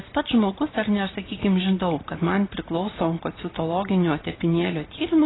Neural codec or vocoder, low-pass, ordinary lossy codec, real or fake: none; 7.2 kHz; AAC, 16 kbps; real